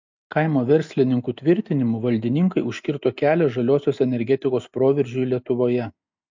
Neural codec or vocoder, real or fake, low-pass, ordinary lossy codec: none; real; 7.2 kHz; MP3, 64 kbps